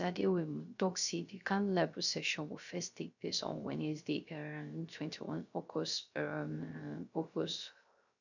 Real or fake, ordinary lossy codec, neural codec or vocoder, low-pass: fake; none; codec, 16 kHz, 0.3 kbps, FocalCodec; 7.2 kHz